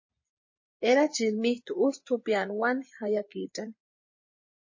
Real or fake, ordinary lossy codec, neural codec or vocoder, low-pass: fake; MP3, 32 kbps; vocoder, 24 kHz, 100 mel bands, Vocos; 7.2 kHz